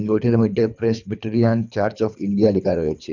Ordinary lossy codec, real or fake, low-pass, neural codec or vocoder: none; fake; 7.2 kHz; codec, 24 kHz, 3 kbps, HILCodec